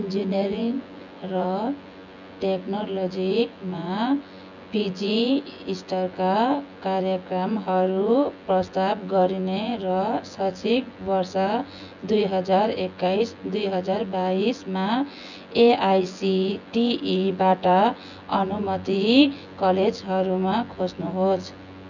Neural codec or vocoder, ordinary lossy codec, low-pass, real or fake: vocoder, 24 kHz, 100 mel bands, Vocos; none; 7.2 kHz; fake